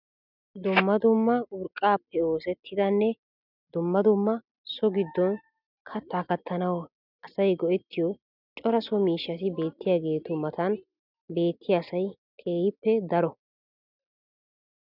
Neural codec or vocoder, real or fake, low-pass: none; real; 5.4 kHz